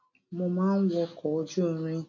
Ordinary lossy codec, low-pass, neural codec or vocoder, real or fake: none; 7.2 kHz; none; real